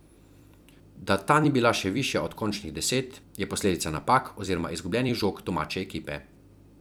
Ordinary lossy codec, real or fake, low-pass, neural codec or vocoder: none; fake; none; vocoder, 44.1 kHz, 128 mel bands every 256 samples, BigVGAN v2